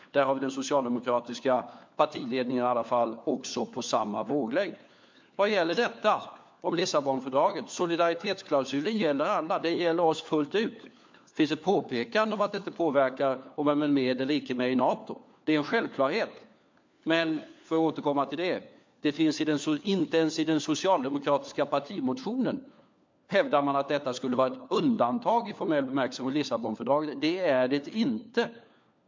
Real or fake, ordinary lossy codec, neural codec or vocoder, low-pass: fake; MP3, 48 kbps; codec, 16 kHz, 4 kbps, FunCodec, trained on LibriTTS, 50 frames a second; 7.2 kHz